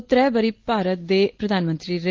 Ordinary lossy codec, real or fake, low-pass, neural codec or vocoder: Opus, 16 kbps; real; 7.2 kHz; none